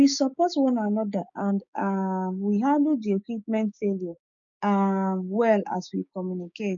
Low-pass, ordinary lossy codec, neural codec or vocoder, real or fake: 7.2 kHz; none; codec, 16 kHz, 6 kbps, DAC; fake